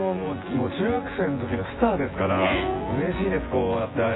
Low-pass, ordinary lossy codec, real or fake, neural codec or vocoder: 7.2 kHz; AAC, 16 kbps; fake; vocoder, 24 kHz, 100 mel bands, Vocos